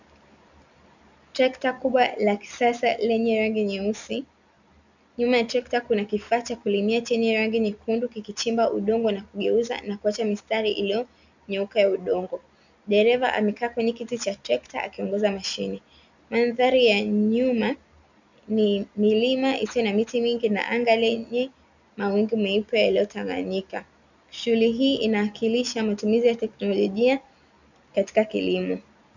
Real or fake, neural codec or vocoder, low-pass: real; none; 7.2 kHz